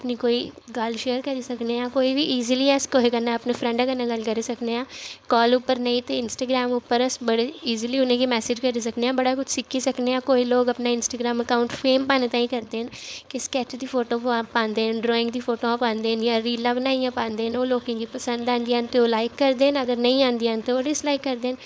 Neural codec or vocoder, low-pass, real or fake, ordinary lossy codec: codec, 16 kHz, 4.8 kbps, FACodec; none; fake; none